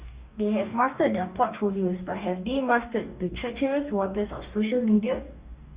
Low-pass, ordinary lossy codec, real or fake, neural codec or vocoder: 3.6 kHz; Opus, 64 kbps; fake; codec, 44.1 kHz, 2.6 kbps, DAC